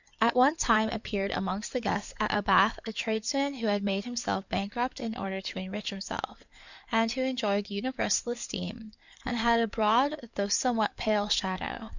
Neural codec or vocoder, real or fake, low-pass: codec, 16 kHz in and 24 kHz out, 2.2 kbps, FireRedTTS-2 codec; fake; 7.2 kHz